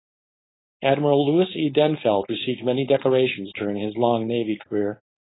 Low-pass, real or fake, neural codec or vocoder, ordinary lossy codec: 7.2 kHz; fake; codec, 16 kHz, 4.8 kbps, FACodec; AAC, 16 kbps